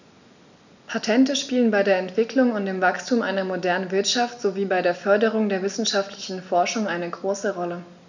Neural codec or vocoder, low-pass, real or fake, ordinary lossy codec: none; 7.2 kHz; real; none